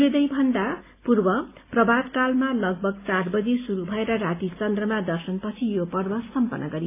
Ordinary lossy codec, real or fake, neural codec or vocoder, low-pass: AAC, 24 kbps; real; none; 3.6 kHz